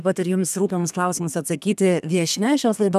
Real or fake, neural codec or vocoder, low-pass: fake; codec, 32 kHz, 1.9 kbps, SNAC; 14.4 kHz